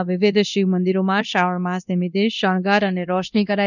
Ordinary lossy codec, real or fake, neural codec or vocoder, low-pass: none; fake; codec, 24 kHz, 0.9 kbps, DualCodec; 7.2 kHz